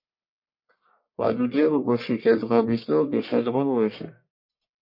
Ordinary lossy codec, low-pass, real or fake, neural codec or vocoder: MP3, 32 kbps; 5.4 kHz; fake; codec, 44.1 kHz, 1.7 kbps, Pupu-Codec